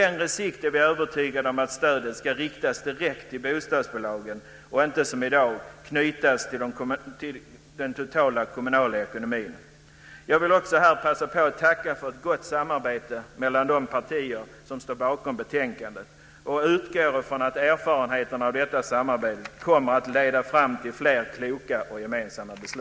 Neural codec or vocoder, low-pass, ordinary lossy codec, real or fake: none; none; none; real